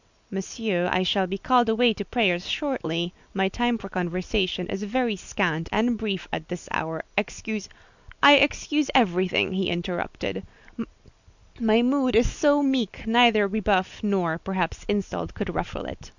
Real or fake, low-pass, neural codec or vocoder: real; 7.2 kHz; none